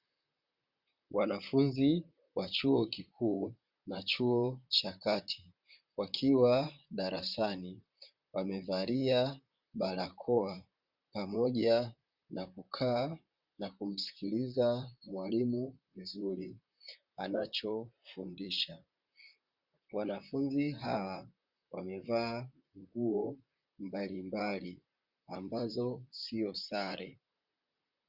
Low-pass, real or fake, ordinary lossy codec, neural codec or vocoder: 5.4 kHz; fake; Opus, 64 kbps; vocoder, 44.1 kHz, 128 mel bands, Pupu-Vocoder